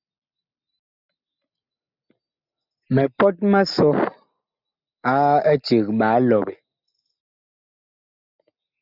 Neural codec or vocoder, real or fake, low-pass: none; real; 5.4 kHz